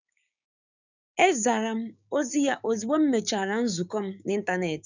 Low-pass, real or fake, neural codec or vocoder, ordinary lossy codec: 7.2 kHz; fake; codec, 16 kHz, 6 kbps, DAC; none